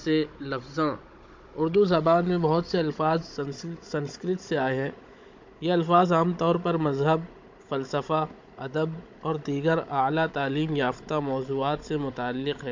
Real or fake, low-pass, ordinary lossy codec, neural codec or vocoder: fake; 7.2 kHz; MP3, 48 kbps; codec, 16 kHz, 16 kbps, FunCodec, trained on Chinese and English, 50 frames a second